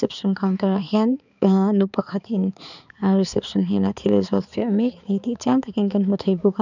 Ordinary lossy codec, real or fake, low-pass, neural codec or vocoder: none; fake; 7.2 kHz; codec, 16 kHz, 4 kbps, X-Codec, HuBERT features, trained on balanced general audio